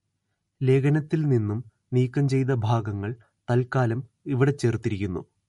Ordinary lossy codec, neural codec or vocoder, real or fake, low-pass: MP3, 48 kbps; none; real; 19.8 kHz